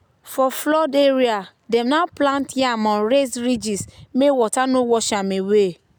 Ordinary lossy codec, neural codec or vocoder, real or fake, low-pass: none; none; real; none